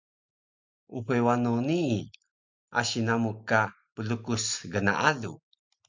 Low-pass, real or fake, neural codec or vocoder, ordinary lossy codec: 7.2 kHz; real; none; MP3, 64 kbps